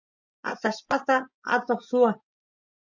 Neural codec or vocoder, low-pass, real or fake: vocoder, 24 kHz, 100 mel bands, Vocos; 7.2 kHz; fake